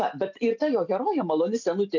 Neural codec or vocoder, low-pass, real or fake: none; 7.2 kHz; real